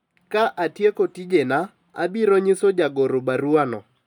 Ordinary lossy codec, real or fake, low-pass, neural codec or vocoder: none; real; 19.8 kHz; none